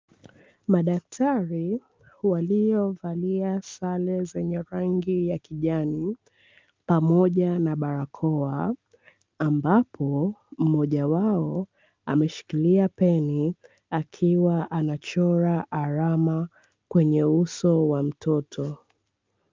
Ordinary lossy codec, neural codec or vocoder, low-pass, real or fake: Opus, 24 kbps; none; 7.2 kHz; real